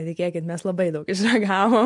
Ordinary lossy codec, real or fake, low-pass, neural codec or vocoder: MP3, 64 kbps; real; 10.8 kHz; none